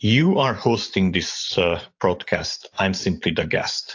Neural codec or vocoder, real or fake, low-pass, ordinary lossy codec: vocoder, 44.1 kHz, 128 mel bands every 512 samples, BigVGAN v2; fake; 7.2 kHz; AAC, 48 kbps